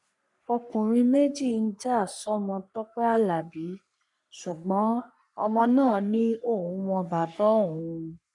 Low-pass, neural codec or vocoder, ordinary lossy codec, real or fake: 10.8 kHz; codec, 44.1 kHz, 3.4 kbps, Pupu-Codec; none; fake